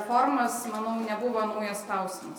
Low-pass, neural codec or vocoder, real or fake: 19.8 kHz; none; real